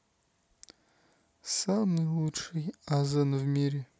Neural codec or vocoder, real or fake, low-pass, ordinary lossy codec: none; real; none; none